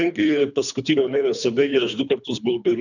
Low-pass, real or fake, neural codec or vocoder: 7.2 kHz; fake; codec, 24 kHz, 3 kbps, HILCodec